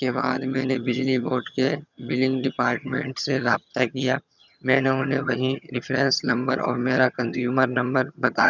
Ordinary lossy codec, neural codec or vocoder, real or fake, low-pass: none; vocoder, 22.05 kHz, 80 mel bands, HiFi-GAN; fake; 7.2 kHz